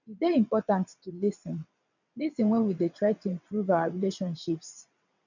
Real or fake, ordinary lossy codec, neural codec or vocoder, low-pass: fake; none; vocoder, 22.05 kHz, 80 mel bands, WaveNeXt; 7.2 kHz